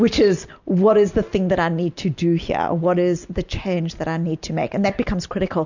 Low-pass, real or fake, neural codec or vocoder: 7.2 kHz; real; none